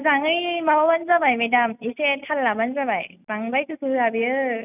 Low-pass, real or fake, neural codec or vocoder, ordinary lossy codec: 3.6 kHz; real; none; none